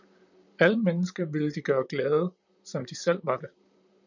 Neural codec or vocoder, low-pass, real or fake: vocoder, 44.1 kHz, 128 mel bands, Pupu-Vocoder; 7.2 kHz; fake